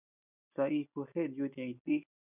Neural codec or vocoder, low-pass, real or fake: none; 3.6 kHz; real